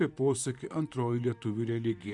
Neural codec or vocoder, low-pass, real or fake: vocoder, 44.1 kHz, 128 mel bands, Pupu-Vocoder; 10.8 kHz; fake